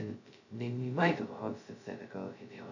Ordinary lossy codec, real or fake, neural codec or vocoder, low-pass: none; fake; codec, 16 kHz, 0.2 kbps, FocalCodec; 7.2 kHz